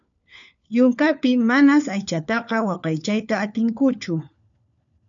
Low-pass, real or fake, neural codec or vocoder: 7.2 kHz; fake; codec, 16 kHz, 4 kbps, FunCodec, trained on LibriTTS, 50 frames a second